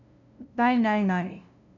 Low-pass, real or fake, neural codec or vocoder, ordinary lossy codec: 7.2 kHz; fake; codec, 16 kHz, 0.5 kbps, FunCodec, trained on LibriTTS, 25 frames a second; none